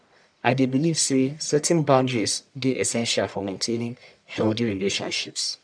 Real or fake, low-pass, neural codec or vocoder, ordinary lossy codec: fake; 9.9 kHz; codec, 44.1 kHz, 1.7 kbps, Pupu-Codec; none